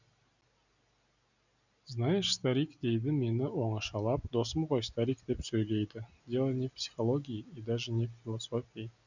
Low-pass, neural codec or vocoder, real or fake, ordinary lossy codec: 7.2 kHz; none; real; none